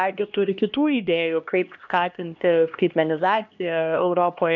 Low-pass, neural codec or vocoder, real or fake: 7.2 kHz; codec, 16 kHz, 1 kbps, X-Codec, HuBERT features, trained on LibriSpeech; fake